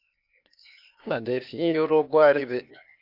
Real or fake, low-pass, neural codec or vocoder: fake; 5.4 kHz; codec, 16 kHz, 0.8 kbps, ZipCodec